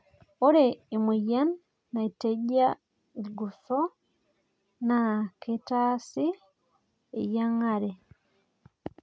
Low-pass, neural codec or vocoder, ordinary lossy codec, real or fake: none; none; none; real